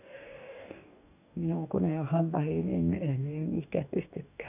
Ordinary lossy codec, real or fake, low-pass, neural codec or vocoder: none; fake; 3.6 kHz; codec, 44.1 kHz, 2.6 kbps, DAC